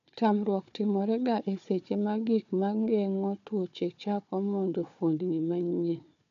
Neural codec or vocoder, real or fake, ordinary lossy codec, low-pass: codec, 16 kHz, 4 kbps, FunCodec, trained on Chinese and English, 50 frames a second; fake; AAC, 64 kbps; 7.2 kHz